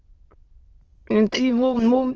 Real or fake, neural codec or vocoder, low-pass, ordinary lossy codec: fake; autoencoder, 22.05 kHz, a latent of 192 numbers a frame, VITS, trained on many speakers; 7.2 kHz; Opus, 16 kbps